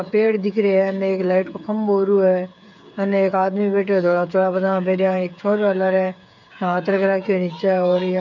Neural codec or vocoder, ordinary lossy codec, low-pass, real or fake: codec, 16 kHz, 8 kbps, FreqCodec, smaller model; none; 7.2 kHz; fake